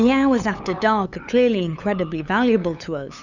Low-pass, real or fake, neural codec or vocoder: 7.2 kHz; fake; codec, 16 kHz, 8 kbps, FunCodec, trained on LibriTTS, 25 frames a second